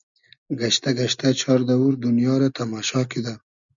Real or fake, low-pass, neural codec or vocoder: real; 7.2 kHz; none